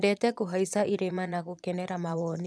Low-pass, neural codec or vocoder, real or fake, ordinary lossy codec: none; vocoder, 22.05 kHz, 80 mel bands, Vocos; fake; none